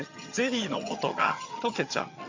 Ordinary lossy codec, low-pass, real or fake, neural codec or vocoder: MP3, 64 kbps; 7.2 kHz; fake; vocoder, 22.05 kHz, 80 mel bands, HiFi-GAN